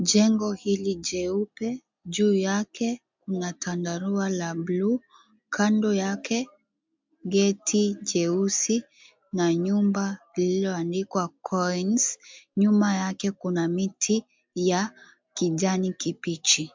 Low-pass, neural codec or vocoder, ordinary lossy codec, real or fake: 7.2 kHz; none; MP3, 64 kbps; real